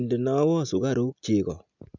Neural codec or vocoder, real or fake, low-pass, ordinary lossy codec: none; real; 7.2 kHz; none